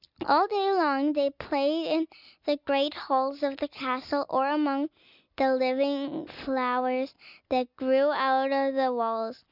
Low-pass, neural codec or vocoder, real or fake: 5.4 kHz; none; real